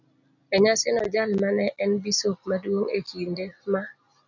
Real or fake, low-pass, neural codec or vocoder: real; 7.2 kHz; none